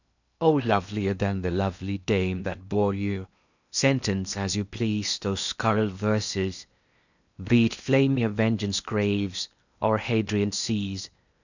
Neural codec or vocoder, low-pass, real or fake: codec, 16 kHz in and 24 kHz out, 0.8 kbps, FocalCodec, streaming, 65536 codes; 7.2 kHz; fake